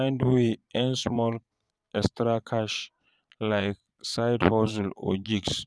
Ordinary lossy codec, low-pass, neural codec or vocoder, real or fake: none; none; vocoder, 22.05 kHz, 80 mel bands, Vocos; fake